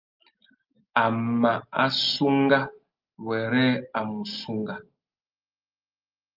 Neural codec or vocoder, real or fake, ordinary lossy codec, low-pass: none; real; Opus, 32 kbps; 5.4 kHz